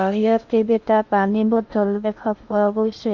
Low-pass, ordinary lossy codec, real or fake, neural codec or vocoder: 7.2 kHz; none; fake; codec, 16 kHz in and 24 kHz out, 0.6 kbps, FocalCodec, streaming, 2048 codes